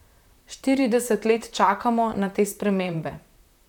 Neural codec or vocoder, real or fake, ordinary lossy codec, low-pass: vocoder, 44.1 kHz, 128 mel bands, Pupu-Vocoder; fake; none; 19.8 kHz